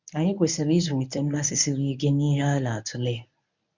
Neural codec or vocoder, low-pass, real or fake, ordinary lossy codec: codec, 24 kHz, 0.9 kbps, WavTokenizer, medium speech release version 1; 7.2 kHz; fake; none